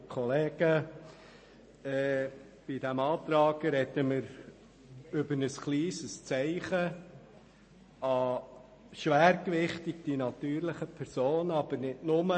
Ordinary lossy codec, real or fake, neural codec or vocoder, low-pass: MP3, 32 kbps; real; none; 9.9 kHz